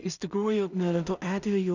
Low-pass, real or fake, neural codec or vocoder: 7.2 kHz; fake; codec, 16 kHz in and 24 kHz out, 0.4 kbps, LongCat-Audio-Codec, two codebook decoder